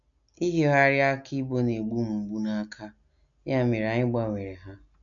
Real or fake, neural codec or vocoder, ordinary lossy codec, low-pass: real; none; none; 7.2 kHz